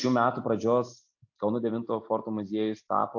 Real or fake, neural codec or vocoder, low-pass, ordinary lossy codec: real; none; 7.2 kHz; AAC, 48 kbps